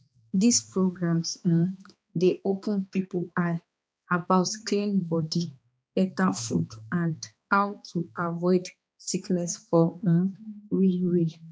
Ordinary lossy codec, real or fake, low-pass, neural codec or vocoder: none; fake; none; codec, 16 kHz, 2 kbps, X-Codec, HuBERT features, trained on balanced general audio